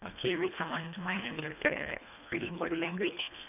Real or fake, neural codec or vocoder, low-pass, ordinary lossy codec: fake; codec, 24 kHz, 1.5 kbps, HILCodec; 3.6 kHz; none